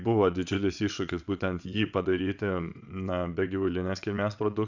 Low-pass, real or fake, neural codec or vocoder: 7.2 kHz; fake; vocoder, 22.05 kHz, 80 mel bands, WaveNeXt